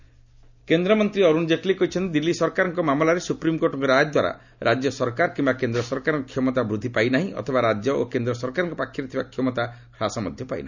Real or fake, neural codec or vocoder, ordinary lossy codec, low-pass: real; none; none; 7.2 kHz